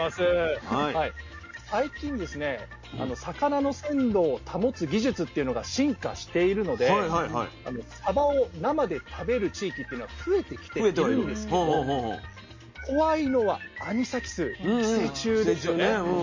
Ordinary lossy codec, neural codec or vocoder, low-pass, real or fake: MP3, 32 kbps; none; 7.2 kHz; real